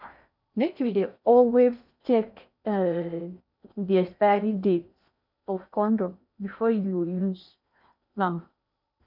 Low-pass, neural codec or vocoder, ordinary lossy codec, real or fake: 5.4 kHz; codec, 16 kHz in and 24 kHz out, 0.6 kbps, FocalCodec, streaming, 2048 codes; none; fake